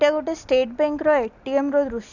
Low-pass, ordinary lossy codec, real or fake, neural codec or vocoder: 7.2 kHz; none; real; none